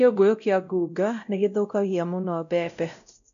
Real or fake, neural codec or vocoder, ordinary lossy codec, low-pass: fake; codec, 16 kHz, 0.5 kbps, X-Codec, WavLM features, trained on Multilingual LibriSpeech; none; 7.2 kHz